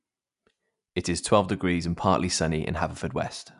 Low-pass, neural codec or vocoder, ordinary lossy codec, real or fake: 10.8 kHz; none; none; real